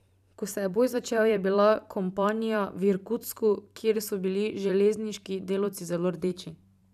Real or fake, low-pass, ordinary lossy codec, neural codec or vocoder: fake; 14.4 kHz; none; vocoder, 44.1 kHz, 128 mel bands every 512 samples, BigVGAN v2